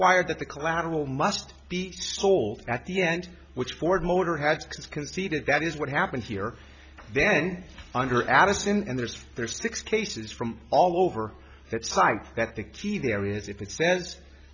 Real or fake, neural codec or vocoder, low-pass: real; none; 7.2 kHz